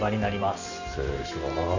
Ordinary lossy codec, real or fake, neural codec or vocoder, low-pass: none; real; none; 7.2 kHz